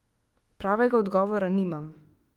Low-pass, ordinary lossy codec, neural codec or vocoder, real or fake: 19.8 kHz; Opus, 24 kbps; autoencoder, 48 kHz, 32 numbers a frame, DAC-VAE, trained on Japanese speech; fake